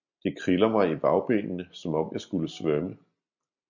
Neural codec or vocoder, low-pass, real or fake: none; 7.2 kHz; real